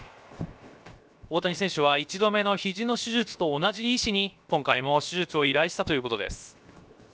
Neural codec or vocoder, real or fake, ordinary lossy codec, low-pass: codec, 16 kHz, 0.7 kbps, FocalCodec; fake; none; none